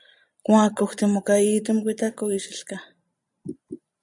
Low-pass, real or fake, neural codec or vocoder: 10.8 kHz; real; none